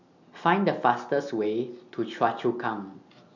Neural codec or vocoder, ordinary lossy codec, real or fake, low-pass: none; none; real; 7.2 kHz